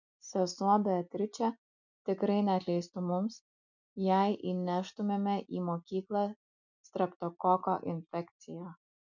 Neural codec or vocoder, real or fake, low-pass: none; real; 7.2 kHz